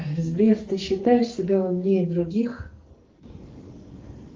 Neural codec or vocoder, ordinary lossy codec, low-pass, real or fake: codec, 44.1 kHz, 2.6 kbps, SNAC; Opus, 32 kbps; 7.2 kHz; fake